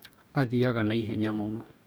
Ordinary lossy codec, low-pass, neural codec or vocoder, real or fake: none; none; codec, 44.1 kHz, 2.6 kbps, DAC; fake